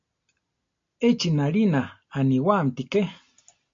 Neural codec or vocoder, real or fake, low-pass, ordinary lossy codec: none; real; 7.2 kHz; AAC, 48 kbps